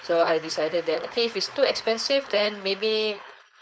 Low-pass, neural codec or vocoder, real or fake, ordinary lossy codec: none; codec, 16 kHz, 4.8 kbps, FACodec; fake; none